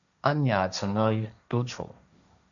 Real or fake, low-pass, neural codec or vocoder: fake; 7.2 kHz; codec, 16 kHz, 1.1 kbps, Voila-Tokenizer